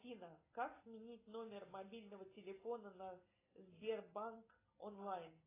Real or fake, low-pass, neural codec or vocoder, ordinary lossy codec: real; 3.6 kHz; none; AAC, 16 kbps